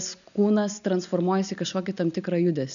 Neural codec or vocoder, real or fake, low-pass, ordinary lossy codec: none; real; 7.2 kHz; MP3, 96 kbps